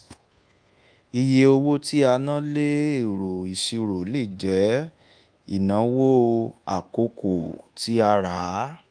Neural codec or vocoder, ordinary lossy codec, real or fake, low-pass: codec, 24 kHz, 1.2 kbps, DualCodec; Opus, 32 kbps; fake; 9.9 kHz